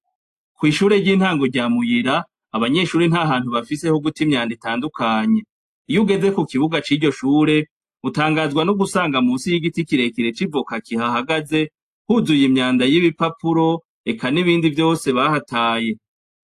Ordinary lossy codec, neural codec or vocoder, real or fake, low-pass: AAC, 64 kbps; none; real; 14.4 kHz